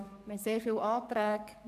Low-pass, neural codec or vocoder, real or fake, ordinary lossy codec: 14.4 kHz; codec, 44.1 kHz, 7.8 kbps, DAC; fake; AAC, 96 kbps